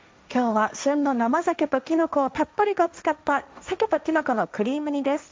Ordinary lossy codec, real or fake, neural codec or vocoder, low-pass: none; fake; codec, 16 kHz, 1.1 kbps, Voila-Tokenizer; none